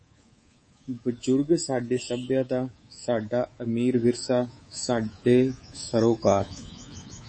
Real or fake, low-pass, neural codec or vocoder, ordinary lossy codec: fake; 9.9 kHz; codec, 24 kHz, 3.1 kbps, DualCodec; MP3, 32 kbps